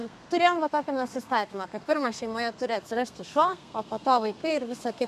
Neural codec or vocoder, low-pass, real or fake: codec, 32 kHz, 1.9 kbps, SNAC; 14.4 kHz; fake